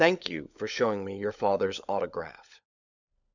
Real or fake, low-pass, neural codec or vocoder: fake; 7.2 kHz; codec, 16 kHz, 16 kbps, FunCodec, trained on LibriTTS, 50 frames a second